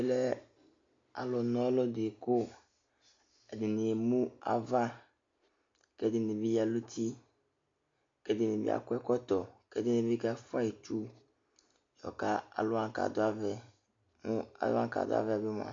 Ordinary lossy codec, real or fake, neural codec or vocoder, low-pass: AAC, 48 kbps; real; none; 7.2 kHz